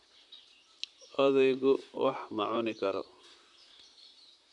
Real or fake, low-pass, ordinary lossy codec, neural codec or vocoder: fake; 10.8 kHz; none; vocoder, 44.1 kHz, 128 mel bands, Pupu-Vocoder